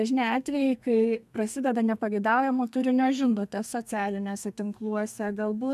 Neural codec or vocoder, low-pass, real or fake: codec, 32 kHz, 1.9 kbps, SNAC; 14.4 kHz; fake